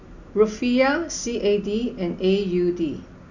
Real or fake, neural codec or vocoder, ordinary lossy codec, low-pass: real; none; none; 7.2 kHz